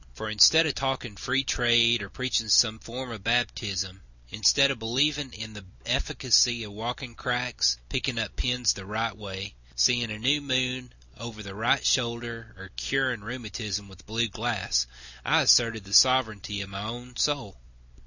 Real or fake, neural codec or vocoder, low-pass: real; none; 7.2 kHz